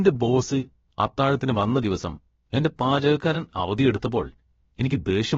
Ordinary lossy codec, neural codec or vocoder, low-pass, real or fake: AAC, 24 kbps; codec, 16 kHz, about 1 kbps, DyCAST, with the encoder's durations; 7.2 kHz; fake